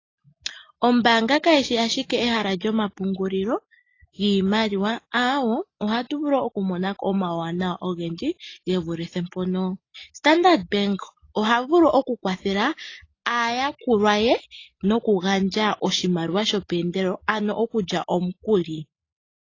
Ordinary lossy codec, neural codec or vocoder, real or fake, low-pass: AAC, 32 kbps; none; real; 7.2 kHz